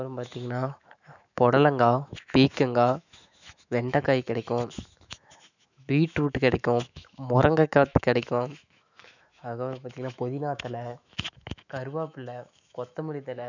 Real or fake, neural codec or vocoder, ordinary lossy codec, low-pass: fake; autoencoder, 48 kHz, 128 numbers a frame, DAC-VAE, trained on Japanese speech; none; 7.2 kHz